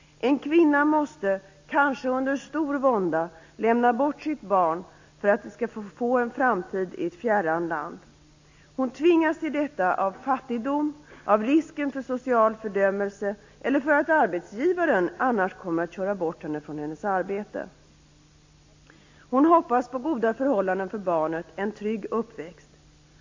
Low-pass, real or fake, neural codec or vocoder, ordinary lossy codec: 7.2 kHz; real; none; AAC, 48 kbps